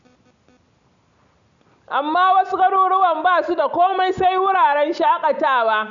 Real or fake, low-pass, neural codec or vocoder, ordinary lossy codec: real; 7.2 kHz; none; none